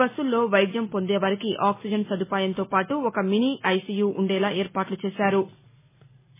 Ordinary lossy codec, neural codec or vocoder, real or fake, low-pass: MP3, 16 kbps; none; real; 3.6 kHz